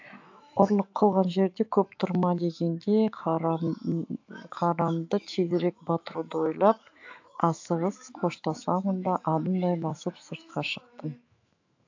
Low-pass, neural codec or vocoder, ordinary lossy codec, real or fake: 7.2 kHz; autoencoder, 48 kHz, 128 numbers a frame, DAC-VAE, trained on Japanese speech; AAC, 48 kbps; fake